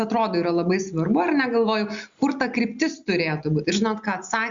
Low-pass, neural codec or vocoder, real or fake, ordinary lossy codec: 7.2 kHz; none; real; Opus, 64 kbps